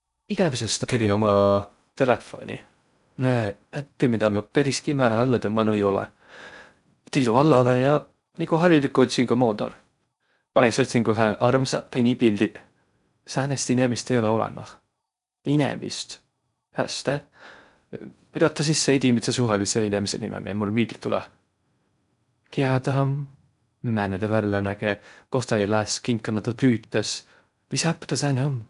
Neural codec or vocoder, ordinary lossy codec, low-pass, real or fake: codec, 16 kHz in and 24 kHz out, 0.6 kbps, FocalCodec, streaming, 4096 codes; none; 10.8 kHz; fake